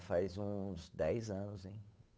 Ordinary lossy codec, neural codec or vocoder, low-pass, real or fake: none; codec, 16 kHz, 8 kbps, FunCodec, trained on Chinese and English, 25 frames a second; none; fake